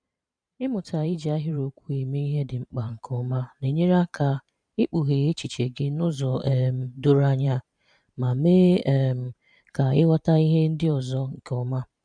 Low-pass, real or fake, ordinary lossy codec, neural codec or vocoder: 9.9 kHz; fake; none; vocoder, 44.1 kHz, 128 mel bands every 512 samples, BigVGAN v2